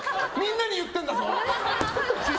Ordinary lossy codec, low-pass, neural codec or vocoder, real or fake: none; none; none; real